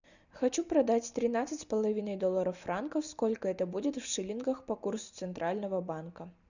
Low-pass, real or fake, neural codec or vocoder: 7.2 kHz; real; none